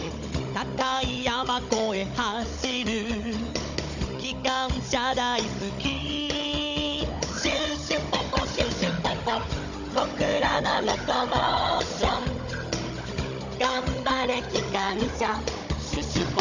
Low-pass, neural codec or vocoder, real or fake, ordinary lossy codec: 7.2 kHz; codec, 16 kHz, 16 kbps, FunCodec, trained on Chinese and English, 50 frames a second; fake; Opus, 64 kbps